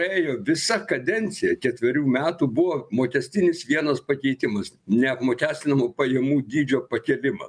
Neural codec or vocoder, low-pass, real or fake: none; 9.9 kHz; real